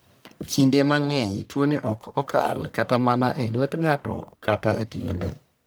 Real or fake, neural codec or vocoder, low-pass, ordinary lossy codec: fake; codec, 44.1 kHz, 1.7 kbps, Pupu-Codec; none; none